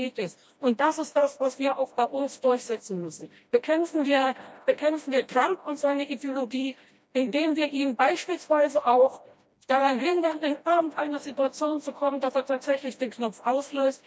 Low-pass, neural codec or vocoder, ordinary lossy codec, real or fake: none; codec, 16 kHz, 1 kbps, FreqCodec, smaller model; none; fake